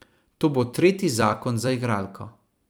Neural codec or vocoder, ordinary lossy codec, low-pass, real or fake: vocoder, 44.1 kHz, 128 mel bands every 256 samples, BigVGAN v2; none; none; fake